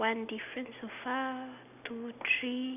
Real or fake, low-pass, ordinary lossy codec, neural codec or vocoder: real; 3.6 kHz; none; none